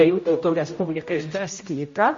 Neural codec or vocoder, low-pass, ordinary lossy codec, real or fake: codec, 16 kHz, 0.5 kbps, X-Codec, HuBERT features, trained on general audio; 7.2 kHz; MP3, 32 kbps; fake